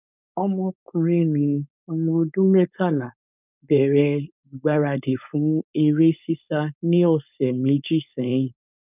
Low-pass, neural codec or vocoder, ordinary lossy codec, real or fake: 3.6 kHz; codec, 16 kHz, 4.8 kbps, FACodec; none; fake